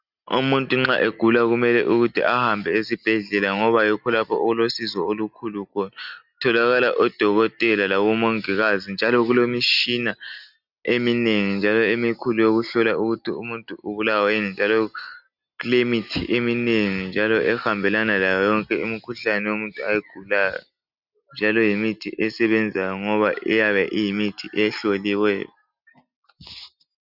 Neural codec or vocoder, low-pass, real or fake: none; 5.4 kHz; real